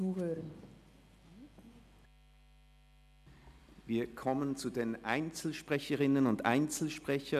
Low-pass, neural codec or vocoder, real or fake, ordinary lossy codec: 14.4 kHz; none; real; none